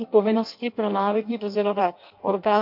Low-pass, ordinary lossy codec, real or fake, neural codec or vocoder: 5.4 kHz; MP3, 48 kbps; fake; codec, 16 kHz in and 24 kHz out, 0.6 kbps, FireRedTTS-2 codec